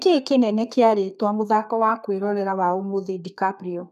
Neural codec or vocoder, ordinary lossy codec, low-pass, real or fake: codec, 32 kHz, 1.9 kbps, SNAC; none; 14.4 kHz; fake